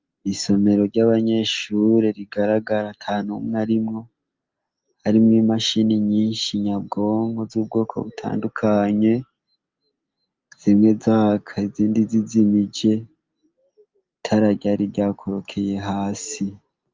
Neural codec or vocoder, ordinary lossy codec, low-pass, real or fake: none; Opus, 32 kbps; 7.2 kHz; real